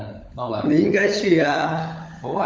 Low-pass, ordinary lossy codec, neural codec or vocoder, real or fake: none; none; codec, 16 kHz, 16 kbps, FunCodec, trained on LibriTTS, 50 frames a second; fake